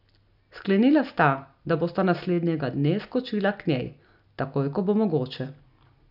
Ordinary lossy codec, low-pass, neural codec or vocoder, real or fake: none; 5.4 kHz; none; real